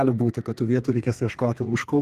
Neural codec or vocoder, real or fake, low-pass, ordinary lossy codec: codec, 32 kHz, 1.9 kbps, SNAC; fake; 14.4 kHz; Opus, 32 kbps